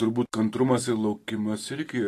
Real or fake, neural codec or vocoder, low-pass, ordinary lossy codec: real; none; 14.4 kHz; AAC, 48 kbps